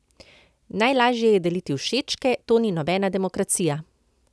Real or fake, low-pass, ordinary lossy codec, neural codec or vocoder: real; none; none; none